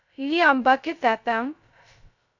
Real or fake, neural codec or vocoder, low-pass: fake; codec, 16 kHz, 0.2 kbps, FocalCodec; 7.2 kHz